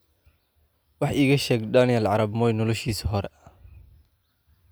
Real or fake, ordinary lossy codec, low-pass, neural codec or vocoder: real; none; none; none